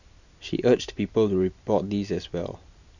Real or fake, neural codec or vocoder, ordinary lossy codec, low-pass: real; none; none; 7.2 kHz